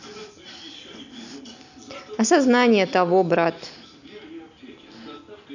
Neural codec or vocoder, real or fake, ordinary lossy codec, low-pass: none; real; none; 7.2 kHz